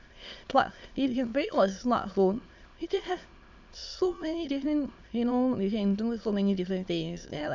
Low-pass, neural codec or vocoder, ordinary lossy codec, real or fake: 7.2 kHz; autoencoder, 22.05 kHz, a latent of 192 numbers a frame, VITS, trained on many speakers; MP3, 64 kbps; fake